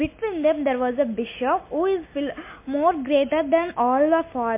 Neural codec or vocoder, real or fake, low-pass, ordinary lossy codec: none; real; 3.6 kHz; MP3, 24 kbps